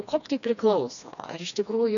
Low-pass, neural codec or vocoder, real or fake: 7.2 kHz; codec, 16 kHz, 2 kbps, FreqCodec, smaller model; fake